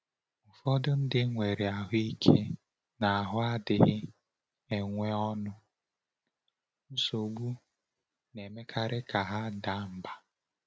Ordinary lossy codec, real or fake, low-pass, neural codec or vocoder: none; real; none; none